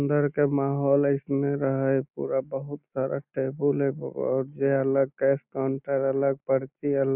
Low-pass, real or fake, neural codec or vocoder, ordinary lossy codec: 3.6 kHz; real; none; none